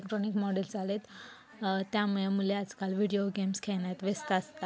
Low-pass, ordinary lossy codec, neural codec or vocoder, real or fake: none; none; none; real